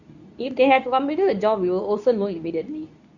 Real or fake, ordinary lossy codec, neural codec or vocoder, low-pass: fake; none; codec, 24 kHz, 0.9 kbps, WavTokenizer, medium speech release version 2; 7.2 kHz